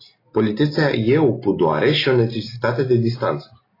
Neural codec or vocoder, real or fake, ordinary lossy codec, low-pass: none; real; AAC, 24 kbps; 5.4 kHz